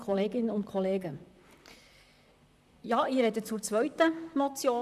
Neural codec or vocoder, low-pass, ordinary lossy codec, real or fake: vocoder, 44.1 kHz, 128 mel bands, Pupu-Vocoder; 14.4 kHz; none; fake